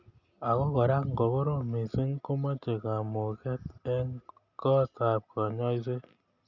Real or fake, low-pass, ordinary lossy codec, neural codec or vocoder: real; 7.2 kHz; none; none